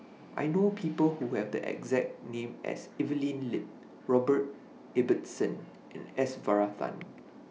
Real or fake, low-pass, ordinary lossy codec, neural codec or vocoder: real; none; none; none